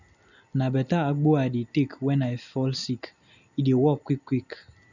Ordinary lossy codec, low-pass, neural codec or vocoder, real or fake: none; 7.2 kHz; none; real